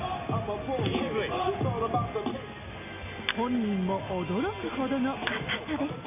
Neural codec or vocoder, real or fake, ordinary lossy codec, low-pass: none; real; none; 3.6 kHz